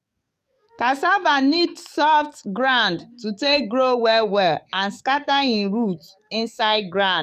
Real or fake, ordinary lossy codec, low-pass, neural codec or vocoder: fake; none; 14.4 kHz; codec, 44.1 kHz, 7.8 kbps, DAC